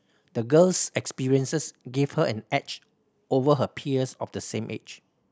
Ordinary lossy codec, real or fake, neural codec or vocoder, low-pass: none; real; none; none